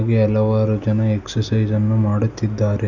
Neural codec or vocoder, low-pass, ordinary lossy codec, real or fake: none; 7.2 kHz; none; real